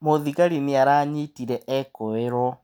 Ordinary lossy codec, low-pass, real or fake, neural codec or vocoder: none; none; real; none